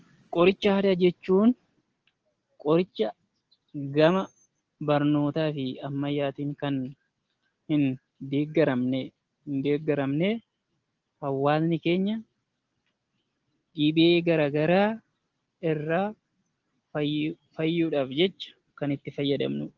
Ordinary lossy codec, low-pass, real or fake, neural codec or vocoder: Opus, 16 kbps; 7.2 kHz; real; none